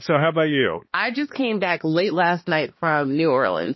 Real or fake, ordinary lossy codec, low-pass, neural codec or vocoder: fake; MP3, 24 kbps; 7.2 kHz; codec, 16 kHz, 2 kbps, X-Codec, HuBERT features, trained on balanced general audio